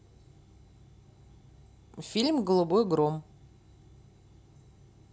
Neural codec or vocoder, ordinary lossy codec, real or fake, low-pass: none; none; real; none